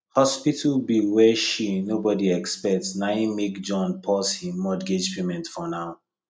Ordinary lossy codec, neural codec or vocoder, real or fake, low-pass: none; none; real; none